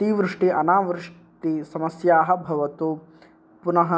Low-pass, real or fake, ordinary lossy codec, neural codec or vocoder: none; real; none; none